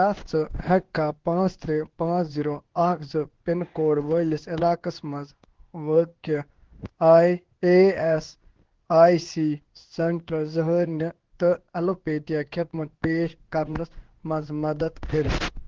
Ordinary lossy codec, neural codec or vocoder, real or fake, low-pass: Opus, 16 kbps; codec, 16 kHz in and 24 kHz out, 1 kbps, XY-Tokenizer; fake; 7.2 kHz